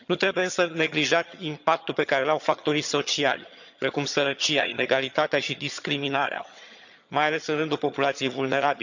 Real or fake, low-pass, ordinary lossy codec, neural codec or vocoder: fake; 7.2 kHz; none; vocoder, 22.05 kHz, 80 mel bands, HiFi-GAN